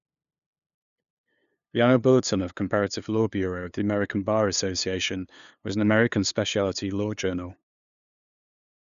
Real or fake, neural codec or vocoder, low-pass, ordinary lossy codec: fake; codec, 16 kHz, 2 kbps, FunCodec, trained on LibriTTS, 25 frames a second; 7.2 kHz; none